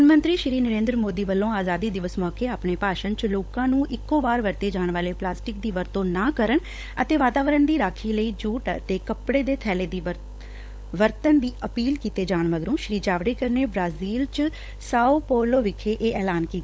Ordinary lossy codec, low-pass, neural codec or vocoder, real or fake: none; none; codec, 16 kHz, 16 kbps, FunCodec, trained on LibriTTS, 50 frames a second; fake